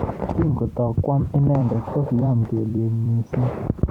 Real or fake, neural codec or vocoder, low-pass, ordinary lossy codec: fake; vocoder, 44.1 kHz, 128 mel bands every 256 samples, BigVGAN v2; 19.8 kHz; Opus, 64 kbps